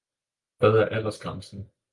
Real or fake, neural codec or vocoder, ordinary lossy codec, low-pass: real; none; Opus, 32 kbps; 10.8 kHz